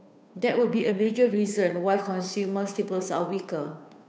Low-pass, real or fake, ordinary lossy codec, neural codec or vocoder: none; fake; none; codec, 16 kHz, 2 kbps, FunCodec, trained on Chinese and English, 25 frames a second